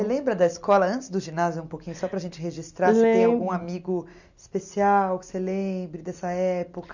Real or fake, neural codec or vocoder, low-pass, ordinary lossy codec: real; none; 7.2 kHz; none